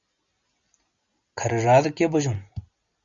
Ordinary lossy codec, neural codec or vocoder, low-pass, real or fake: Opus, 64 kbps; none; 7.2 kHz; real